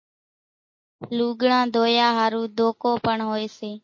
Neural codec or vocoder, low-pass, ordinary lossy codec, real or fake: none; 7.2 kHz; MP3, 32 kbps; real